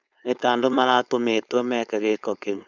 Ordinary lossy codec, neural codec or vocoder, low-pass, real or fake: none; codec, 16 kHz, 4.8 kbps, FACodec; 7.2 kHz; fake